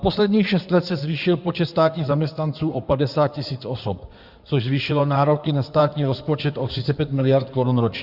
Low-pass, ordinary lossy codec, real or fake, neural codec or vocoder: 5.4 kHz; Opus, 64 kbps; fake; codec, 16 kHz in and 24 kHz out, 2.2 kbps, FireRedTTS-2 codec